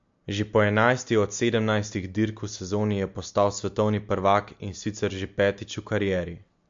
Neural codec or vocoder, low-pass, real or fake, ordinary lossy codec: none; 7.2 kHz; real; MP3, 48 kbps